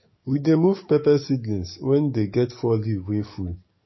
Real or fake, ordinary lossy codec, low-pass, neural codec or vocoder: fake; MP3, 24 kbps; 7.2 kHz; codec, 44.1 kHz, 7.8 kbps, DAC